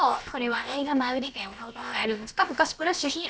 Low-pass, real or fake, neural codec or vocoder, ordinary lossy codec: none; fake; codec, 16 kHz, about 1 kbps, DyCAST, with the encoder's durations; none